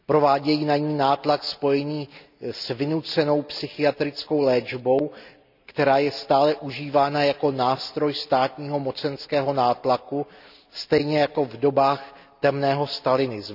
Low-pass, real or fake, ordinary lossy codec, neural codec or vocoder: 5.4 kHz; real; none; none